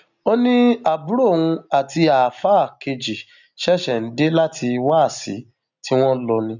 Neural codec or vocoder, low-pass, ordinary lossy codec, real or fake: none; 7.2 kHz; none; real